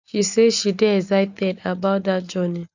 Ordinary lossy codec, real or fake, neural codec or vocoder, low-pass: none; real; none; 7.2 kHz